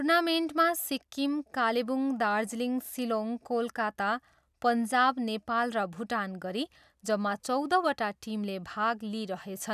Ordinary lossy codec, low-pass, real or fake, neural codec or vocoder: none; 14.4 kHz; real; none